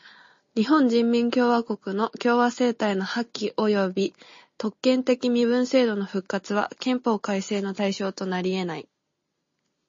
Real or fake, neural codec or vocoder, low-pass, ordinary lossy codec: real; none; 7.2 kHz; MP3, 32 kbps